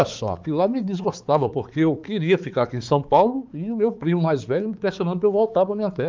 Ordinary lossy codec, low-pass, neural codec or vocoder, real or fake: Opus, 24 kbps; 7.2 kHz; codec, 16 kHz, 4 kbps, X-Codec, HuBERT features, trained on balanced general audio; fake